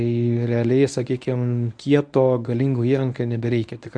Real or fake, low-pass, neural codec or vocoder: fake; 9.9 kHz; codec, 24 kHz, 0.9 kbps, WavTokenizer, medium speech release version 1